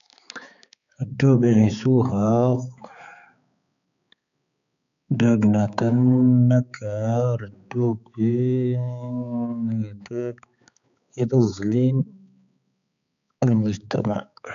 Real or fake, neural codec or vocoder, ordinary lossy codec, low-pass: fake; codec, 16 kHz, 4 kbps, X-Codec, HuBERT features, trained on balanced general audio; none; 7.2 kHz